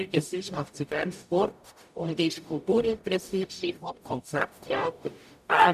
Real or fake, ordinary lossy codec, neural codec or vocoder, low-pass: fake; none; codec, 44.1 kHz, 0.9 kbps, DAC; 14.4 kHz